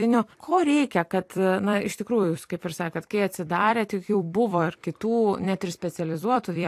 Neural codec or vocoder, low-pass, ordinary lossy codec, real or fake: vocoder, 44.1 kHz, 128 mel bands every 256 samples, BigVGAN v2; 14.4 kHz; AAC, 64 kbps; fake